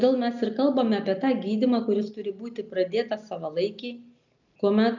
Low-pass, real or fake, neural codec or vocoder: 7.2 kHz; real; none